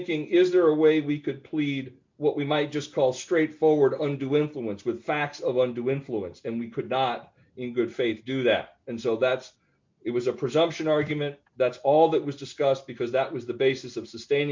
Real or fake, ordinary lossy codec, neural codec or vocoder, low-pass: real; MP3, 48 kbps; none; 7.2 kHz